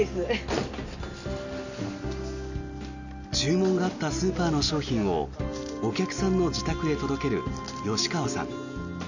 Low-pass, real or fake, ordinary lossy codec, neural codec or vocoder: 7.2 kHz; real; none; none